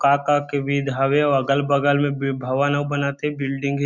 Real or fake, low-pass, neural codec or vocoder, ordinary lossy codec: real; 7.2 kHz; none; Opus, 64 kbps